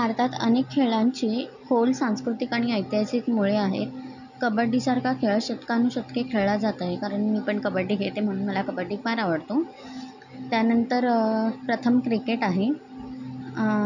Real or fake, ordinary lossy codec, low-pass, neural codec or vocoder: real; none; 7.2 kHz; none